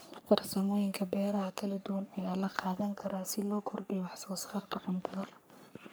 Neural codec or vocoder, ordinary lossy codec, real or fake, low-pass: codec, 44.1 kHz, 3.4 kbps, Pupu-Codec; none; fake; none